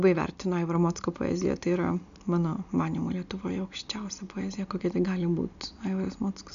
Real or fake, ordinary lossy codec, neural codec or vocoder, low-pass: real; MP3, 96 kbps; none; 7.2 kHz